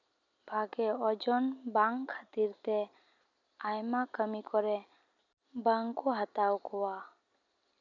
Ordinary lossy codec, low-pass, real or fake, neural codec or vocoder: none; 7.2 kHz; real; none